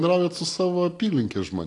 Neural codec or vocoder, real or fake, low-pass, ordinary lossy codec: none; real; 10.8 kHz; AAC, 48 kbps